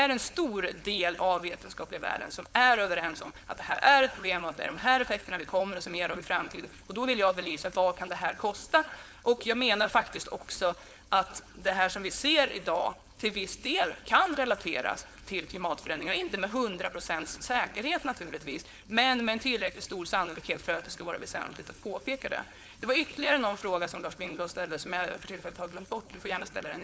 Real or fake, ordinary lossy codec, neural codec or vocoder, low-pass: fake; none; codec, 16 kHz, 4.8 kbps, FACodec; none